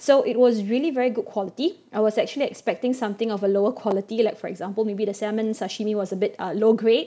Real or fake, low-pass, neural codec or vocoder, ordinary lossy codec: real; none; none; none